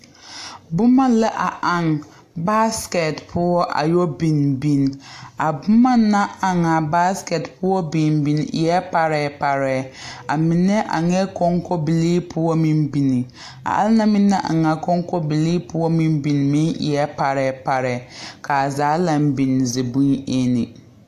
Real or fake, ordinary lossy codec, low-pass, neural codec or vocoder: real; AAC, 64 kbps; 14.4 kHz; none